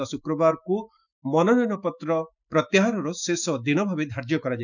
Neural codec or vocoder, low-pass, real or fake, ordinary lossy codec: autoencoder, 48 kHz, 128 numbers a frame, DAC-VAE, trained on Japanese speech; 7.2 kHz; fake; none